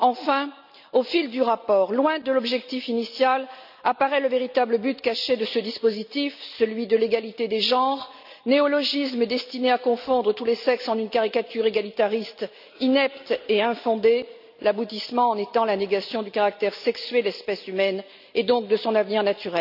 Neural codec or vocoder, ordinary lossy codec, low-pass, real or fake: none; none; 5.4 kHz; real